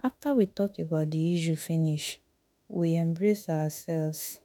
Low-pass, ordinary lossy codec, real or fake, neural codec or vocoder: none; none; fake; autoencoder, 48 kHz, 32 numbers a frame, DAC-VAE, trained on Japanese speech